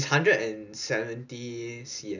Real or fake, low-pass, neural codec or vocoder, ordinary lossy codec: real; 7.2 kHz; none; none